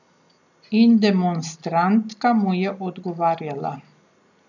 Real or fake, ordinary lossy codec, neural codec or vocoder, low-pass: real; none; none; 7.2 kHz